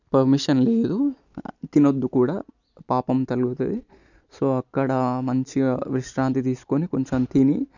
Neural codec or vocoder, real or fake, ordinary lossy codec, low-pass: none; real; none; 7.2 kHz